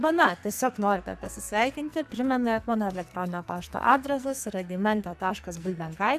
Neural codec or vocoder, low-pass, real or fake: codec, 44.1 kHz, 2.6 kbps, SNAC; 14.4 kHz; fake